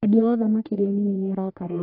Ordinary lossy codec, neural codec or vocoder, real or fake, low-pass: AAC, 32 kbps; codec, 44.1 kHz, 1.7 kbps, Pupu-Codec; fake; 5.4 kHz